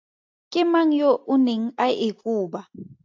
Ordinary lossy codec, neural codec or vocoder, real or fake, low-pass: AAC, 48 kbps; none; real; 7.2 kHz